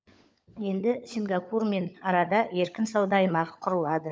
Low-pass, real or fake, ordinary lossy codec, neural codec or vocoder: none; fake; none; codec, 16 kHz, 16 kbps, FunCodec, trained on LibriTTS, 50 frames a second